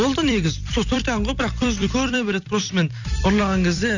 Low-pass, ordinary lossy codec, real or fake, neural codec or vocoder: 7.2 kHz; none; fake; vocoder, 44.1 kHz, 128 mel bands every 256 samples, BigVGAN v2